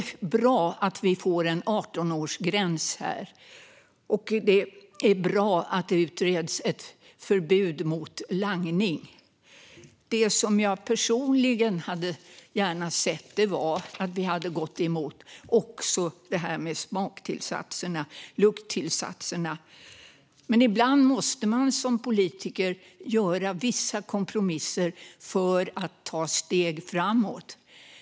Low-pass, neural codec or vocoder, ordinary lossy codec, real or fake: none; none; none; real